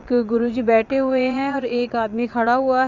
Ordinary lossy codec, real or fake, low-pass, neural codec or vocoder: Opus, 64 kbps; fake; 7.2 kHz; vocoder, 22.05 kHz, 80 mel bands, Vocos